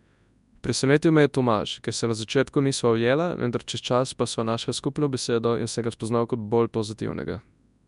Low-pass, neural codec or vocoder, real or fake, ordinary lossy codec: 10.8 kHz; codec, 24 kHz, 0.9 kbps, WavTokenizer, large speech release; fake; none